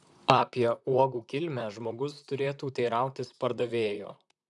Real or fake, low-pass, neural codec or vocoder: fake; 10.8 kHz; vocoder, 44.1 kHz, 128 mel bands, Pupu-Vocoder